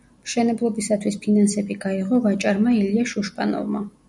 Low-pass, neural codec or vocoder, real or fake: 10.8 kHz; none; real